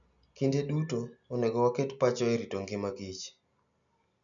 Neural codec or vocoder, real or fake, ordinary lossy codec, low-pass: none; real; none; 7.2 kHz